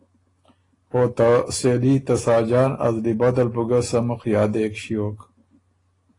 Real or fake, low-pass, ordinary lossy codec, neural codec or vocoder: real; 10.8 kHz; AAC, 32 kbps; none